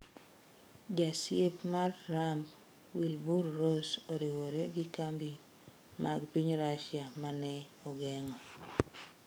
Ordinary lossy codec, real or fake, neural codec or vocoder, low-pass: none; fake; codec, 44.1 kHz, 7.8 kbps, DAC; none